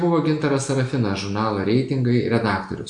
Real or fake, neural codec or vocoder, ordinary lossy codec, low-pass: real; none; AAC, 64 kbps; 9.9 kHz